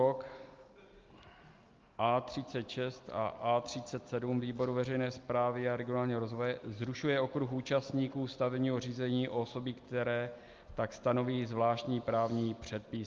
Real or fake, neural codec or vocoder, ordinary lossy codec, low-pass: real; none; Opus, 32 kbps; 7.2 kHz